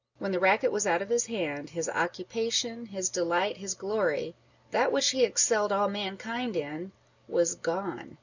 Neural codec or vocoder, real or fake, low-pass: none; real; 7.2 kHz